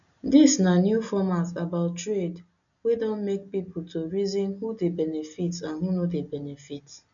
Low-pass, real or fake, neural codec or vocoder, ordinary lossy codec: 7.2 kHz; real; none; none